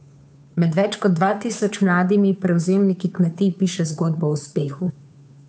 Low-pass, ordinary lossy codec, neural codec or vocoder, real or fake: none; none; codec, 16 kHz, 2 kbps, FunCodec, trained on Chinese and English, 25 frames a second; fake